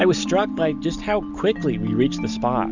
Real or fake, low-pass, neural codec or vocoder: real; 7.2 kHz; none